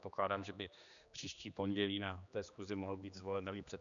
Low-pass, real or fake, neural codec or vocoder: 7.2 kHz; fake; codec, 16 kHz, 2 kbps, X-Codec, HuBERT features, trained on general audio